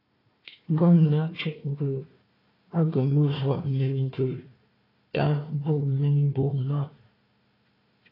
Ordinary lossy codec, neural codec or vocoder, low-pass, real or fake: AAC, 24 kbps; codec, 16 kHz, 1 kbps, FunCodec, trained on Chinese and English, 50 frames a second; 5.4 kHz; fake